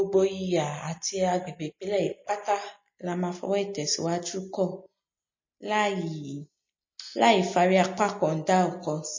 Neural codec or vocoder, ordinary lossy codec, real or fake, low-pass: none; MP3, 32 kbps; real; 7.2 kHz